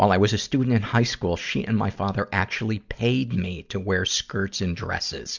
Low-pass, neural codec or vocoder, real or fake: 7.2 kHz; none; real